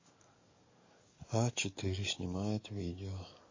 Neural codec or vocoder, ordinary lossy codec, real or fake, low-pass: codec, 44.1 kHz, 7.8 kbps, DAC; MP3, 32 kbps; fake; 7.2 kHz